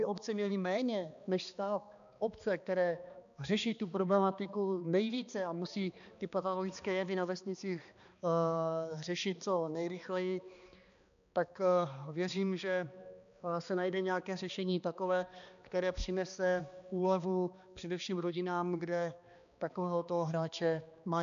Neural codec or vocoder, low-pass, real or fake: codec, 16 kHz, 2 kbps, X-Codec, HuBERT features, trained on balanced general audio; 7.2 kHz; fake